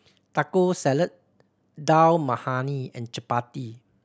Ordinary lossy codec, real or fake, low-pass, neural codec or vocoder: none; real; none; none